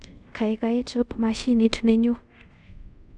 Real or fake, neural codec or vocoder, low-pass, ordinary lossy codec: fake; codec, 24 kHz, 0.5 kbps, DualCodec; 10.8 kHz; none